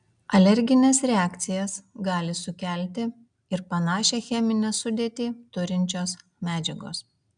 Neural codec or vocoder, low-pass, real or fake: none; 9.9 kHz; real